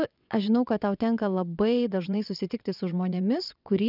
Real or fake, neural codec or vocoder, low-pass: fake; vocoder, 22.05 kHz, 80 mel bands, WaveNeXt; 5.4 kHz